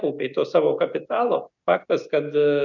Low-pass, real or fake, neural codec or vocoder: 7.2 kHz; real; none